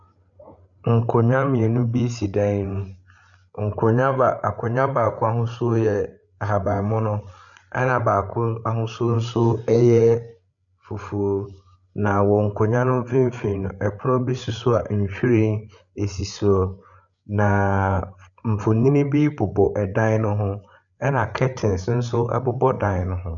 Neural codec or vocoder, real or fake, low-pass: codec, 16 kHz, 8 kbps, FreqCodec, larger model; fake; 7.2 kHz